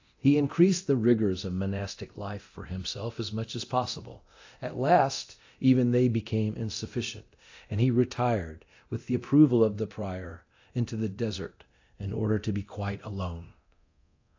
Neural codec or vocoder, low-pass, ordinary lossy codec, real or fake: codec, 24 kHz, 0.9 kbps, DualCodec; 7.2 kHz; AAC, 48 kbps; fake